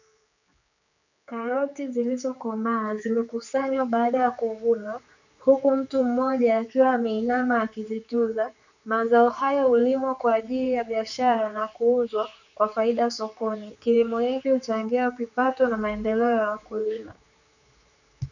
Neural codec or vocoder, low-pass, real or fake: codec, 16 kHz, 4 kbps, X-Codec, HuBERT features, trained on general audio; 7.2 kHz; fake